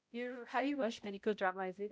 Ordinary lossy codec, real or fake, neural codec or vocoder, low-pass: none; fake; codec, 16 kHz, 0.5 kbps, X-Codec, HuBERT features, trained on balanced general audio; none